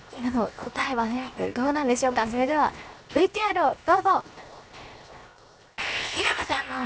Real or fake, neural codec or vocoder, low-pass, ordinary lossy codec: fake; codec, 16 kHz, 0.7 kbps, FocalCodec; none; none